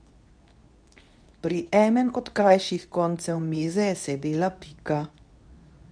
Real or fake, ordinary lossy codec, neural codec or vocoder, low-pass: fake; none; codec, 24 kHz, 0.9 kbps, WavTokenizer, medium speech release version 2; 9.9 kHz